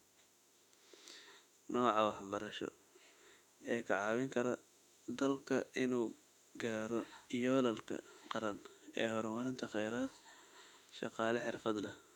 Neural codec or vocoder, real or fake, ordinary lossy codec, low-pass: autoencoder, 48 kHz, 32 numbers a frame, DAC-VAE, trained on Japanese speech; fake; none; 19.8 kHz